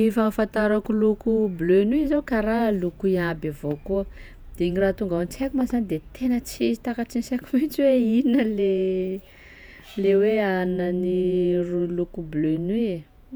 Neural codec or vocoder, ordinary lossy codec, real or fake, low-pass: vocoder, 48 kHz, 128 mel bands, Vocos; none; fake; none